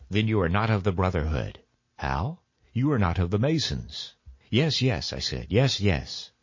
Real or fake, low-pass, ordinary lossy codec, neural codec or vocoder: real; 7.2 kHz; MP3, 32 kbps; none